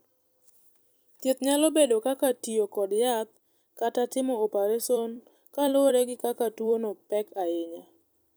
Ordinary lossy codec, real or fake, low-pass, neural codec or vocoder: none; fake; none; vocoder, 44.1 kHz, 128 mel bands every 256 samples, BigVGAN v2